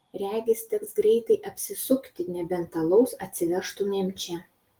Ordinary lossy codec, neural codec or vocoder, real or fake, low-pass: Opus, 24 kbps; none; real; 19.8 kHz